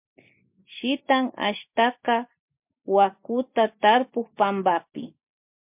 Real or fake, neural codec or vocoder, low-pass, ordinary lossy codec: real; none; 3.6 kHz; MP3, 32 kbps